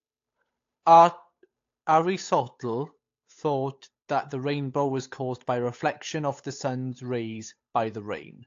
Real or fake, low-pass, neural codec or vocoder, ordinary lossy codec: fake; 7.2 kHz; codec, 16 kHz, 8 kbps, FunCodec, trained on Chinese and English, 25 frames a second; AAC, 48 kbps